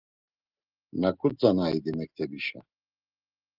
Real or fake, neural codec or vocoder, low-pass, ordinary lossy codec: real; none; 5.4 kHz; Opus, 24 kbps